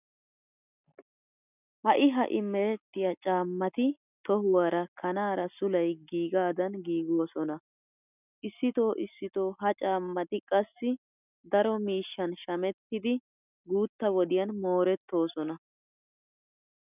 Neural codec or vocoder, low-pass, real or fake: none; 3.6 kHz; real